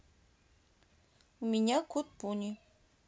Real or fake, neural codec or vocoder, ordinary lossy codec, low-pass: real; none; none; none